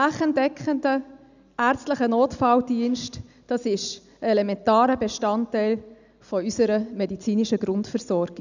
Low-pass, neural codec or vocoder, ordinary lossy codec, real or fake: 7.2 kHz; none; none; real